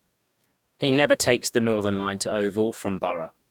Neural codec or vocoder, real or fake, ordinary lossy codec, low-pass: codec, 44.1 kHz, 2.6 kbps, DAC; fake; none; 19.8 kHz